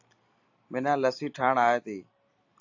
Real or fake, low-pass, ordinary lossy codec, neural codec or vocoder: real; 7.2 kHz; AAC, 48 kbps; none